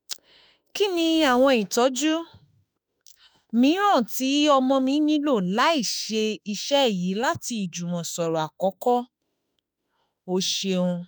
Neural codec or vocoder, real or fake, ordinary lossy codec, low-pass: autoencoder, 48 kHz, 32 numbers a frame, DAC-VAE, trained on Japanese speech; fake; none; none